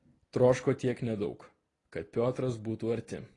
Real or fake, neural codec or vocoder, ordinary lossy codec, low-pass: real; none; AAC, 32 kbps; 10.8 kHz